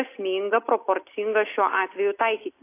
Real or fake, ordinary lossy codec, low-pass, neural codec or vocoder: real; AAC, 24 kbps; 3.6 kHz; none